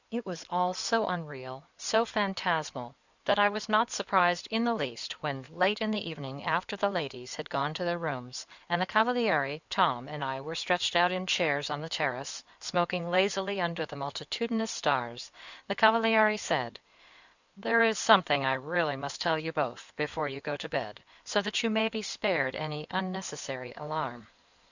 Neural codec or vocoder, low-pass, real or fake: codec, 16 kHz in and 24 kHz out, 2.2 kbps, FireRedTTS-2 codec; 7.2 kHz; fake